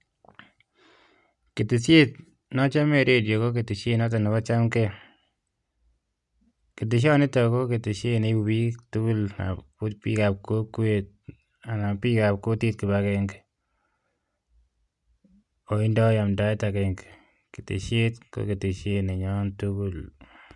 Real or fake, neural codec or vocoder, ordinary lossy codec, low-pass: real; none; none; 10.8 kHz